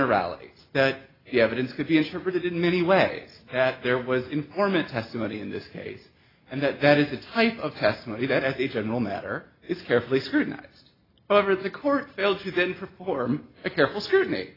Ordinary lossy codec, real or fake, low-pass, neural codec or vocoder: AAC, 24 kbps; real; 5.4 kHz; none